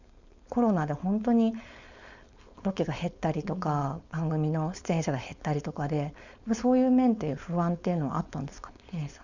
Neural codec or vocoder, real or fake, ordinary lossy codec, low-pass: codec, 16 kHz, 4.8 kbps, FACodec; fake; none; 7.2 kHz